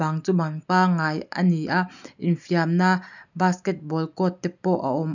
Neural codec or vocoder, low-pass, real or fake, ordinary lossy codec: none; 7.2 kHz; real; none